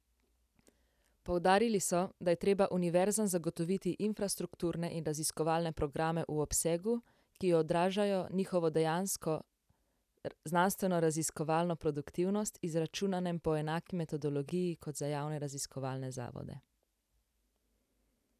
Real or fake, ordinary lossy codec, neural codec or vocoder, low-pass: real; none; none; 14.4 kHz